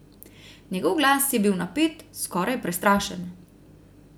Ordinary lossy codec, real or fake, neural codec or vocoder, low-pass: none; real; none; none